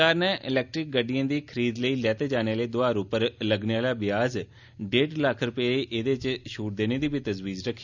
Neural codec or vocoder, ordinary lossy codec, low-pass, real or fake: none; none; 7.2 kHz; real